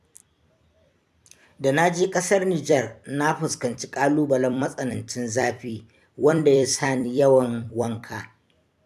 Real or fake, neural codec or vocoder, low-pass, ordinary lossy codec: fake; vocoder, 44.1 kHz, 128 mel bands every 256 samples, BigVGAN v2; 14.4 kHz; AAC, 96 kbps